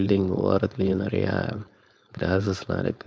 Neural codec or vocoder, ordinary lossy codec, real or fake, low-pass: codec, 16 kHz, 4.8 kbps, FACodec; none; fake; none